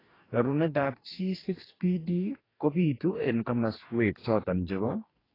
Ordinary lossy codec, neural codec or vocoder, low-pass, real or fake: AAC, 24 kbps; codec, 44.1 kHz, 2.6 kbps, DAC; 5.4 kHz; fake